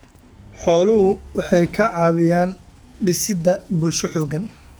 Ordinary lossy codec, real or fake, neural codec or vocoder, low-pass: none; fake; codec, 44.1 kHz, 2.6 kbps, SNAC; none